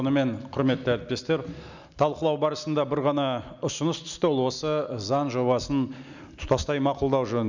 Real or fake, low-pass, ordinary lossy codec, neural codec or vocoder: real; 7.2 kHz; none; none